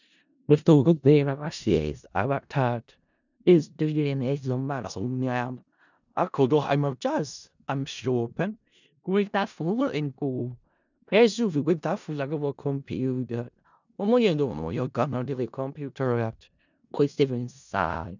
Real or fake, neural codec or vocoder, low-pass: fake; codec, 16 kHz in and 24 kHz out, 0.4 kbps, LongCat-Audio-Codec, four codebook decoder; 7.2 kHz